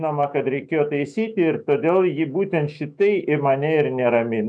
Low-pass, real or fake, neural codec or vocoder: 9.9 kHz; fake; autoencoder, 48 kHz, 128 numbers a frame, DAC-VAE, trained on Japanese speech